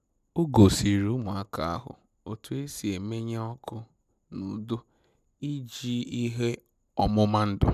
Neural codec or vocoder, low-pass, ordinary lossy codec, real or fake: none; 14.4 kHz; none; real